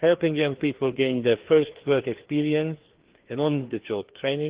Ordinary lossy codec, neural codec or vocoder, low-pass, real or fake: Opus, 16 kbps; codec, 16 kHz, 2 kbps, FreqCodec, larger model; 3.6 kHz; fake